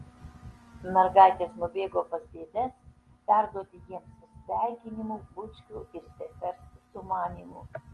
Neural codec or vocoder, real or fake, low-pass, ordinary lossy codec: none; real; 10.8 kHz; Opus, 24 kbps